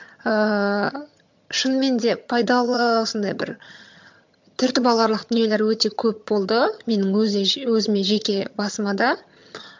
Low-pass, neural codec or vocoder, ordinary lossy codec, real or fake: 7.2 kHz; vocoder, 22.05 kHz, 80 mel bands, HiFi-GAN; MP3, 64 kbps; fake